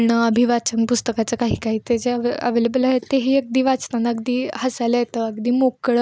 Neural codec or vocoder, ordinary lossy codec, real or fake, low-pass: none; none; real; none